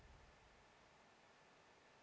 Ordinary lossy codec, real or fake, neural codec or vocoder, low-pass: none; real; none; none